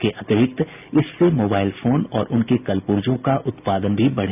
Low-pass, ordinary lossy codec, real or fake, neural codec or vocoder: 3.6 kHz; none; real; none